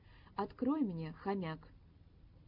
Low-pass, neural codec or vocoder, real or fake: 5.4 kHz; none; real